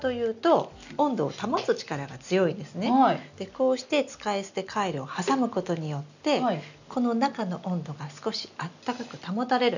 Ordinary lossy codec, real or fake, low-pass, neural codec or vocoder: none; real; 7.2 kHz; none